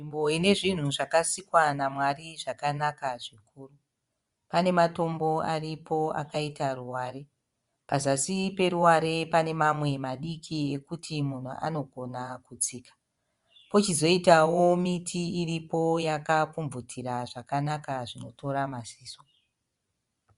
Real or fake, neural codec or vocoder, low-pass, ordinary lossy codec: fake; vocoder, 24 kHz, 100 mel bands, Vocos; 10.8 kHz; Opus, 64 kbps